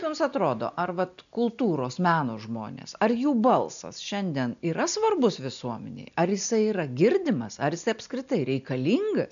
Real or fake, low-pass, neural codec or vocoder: real; 7.2 kHz; none